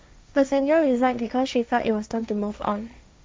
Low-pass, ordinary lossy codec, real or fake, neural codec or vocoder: none; none; fake; codec, 16 kHz, 1.1 kbps, Voila-Tokenizer